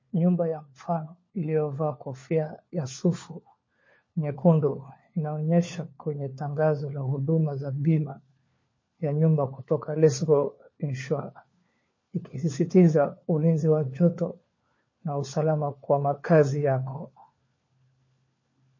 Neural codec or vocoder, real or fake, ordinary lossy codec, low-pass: codec, 16 kHz, 4 kbps, FunCodec, trained on LibriTTS, 50 frames a second; fake; MP3, 32 kbps; 7.2 kHz